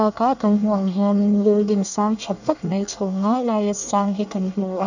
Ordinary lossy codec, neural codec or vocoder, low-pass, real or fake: none; codec, 24 kHz, 1 kbps, SNAC; 7.2 kHz; fake